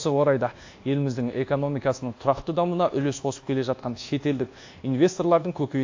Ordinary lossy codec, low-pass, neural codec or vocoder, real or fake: AAC, 48 kbps; 7.2 kHz; codec, 24 kHz, 1.2 kbps, DualCodec; fake